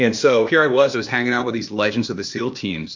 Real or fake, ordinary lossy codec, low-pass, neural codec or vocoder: fake; MP3, 48 kbps; 7.2 kHz; codec, 16 kHz, 0.8 kbps, ZipCodec